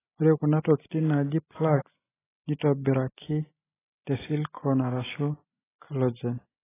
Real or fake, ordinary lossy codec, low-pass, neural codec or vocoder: real; AAC, 16 kbps; 3.6 kHz; none